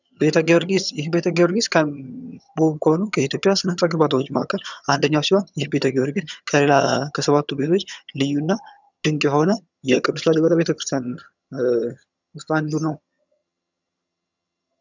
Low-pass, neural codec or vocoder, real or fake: 7.2 kHz; vocoder, 22.05 kHz, 80 mel bands, HiFi-GAN; fake